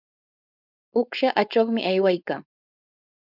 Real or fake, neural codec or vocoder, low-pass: fake; codec, 16 kHz, 4.8 kbps, FACodec; 5.4 kHz